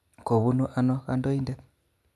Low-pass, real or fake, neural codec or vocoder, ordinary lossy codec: none; real; none; none